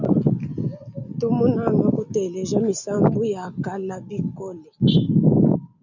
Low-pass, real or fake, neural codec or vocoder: 7.2 kHz; real; none